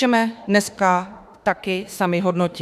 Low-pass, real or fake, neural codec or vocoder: 14.4 kHz; fake; autoencoder, 48 kHz, 32 numbers a frame, DAC-VAE, trained on Japanese speech